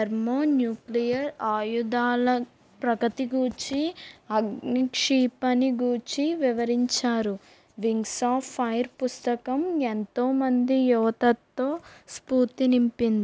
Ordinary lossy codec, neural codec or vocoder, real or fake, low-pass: none; none; real; none